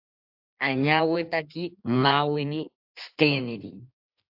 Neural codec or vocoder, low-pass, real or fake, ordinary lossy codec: codec, 16 kHz in and 24 kHz out, 1.1 kbps, FireRedTTS-2 codec; 5.4 kHz; fake; AAC, 48 kbps